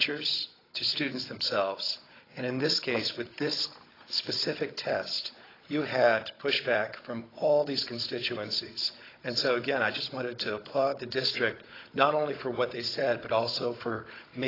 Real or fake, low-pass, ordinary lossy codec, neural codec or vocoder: fake; 5.4 kHz; AAC, 24 kbps; codec, 16 kHz, 16 kbps, FunCodec, trained on Chinese and English, 50 frames a second